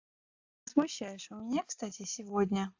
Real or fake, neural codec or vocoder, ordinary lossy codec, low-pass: real; none; AAC, 48 kbps; 7.2 kHz